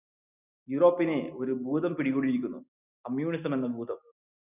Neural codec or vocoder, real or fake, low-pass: none; real; 3.6 kHz